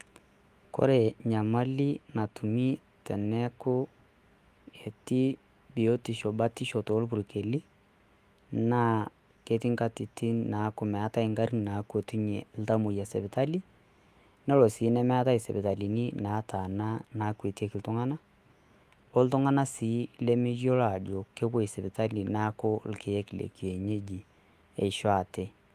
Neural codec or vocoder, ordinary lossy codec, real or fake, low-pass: autoencoder, 48 kHz, 128 numbers a frame, DAC-VAE, trained on Japanese speech; Opus, 24 kbps; fake; 14.4 kHz